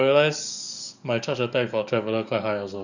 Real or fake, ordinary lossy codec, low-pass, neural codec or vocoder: fake; none; 7.2 kHz; codec, 44.1 kHz, 7.8 kbps, DAC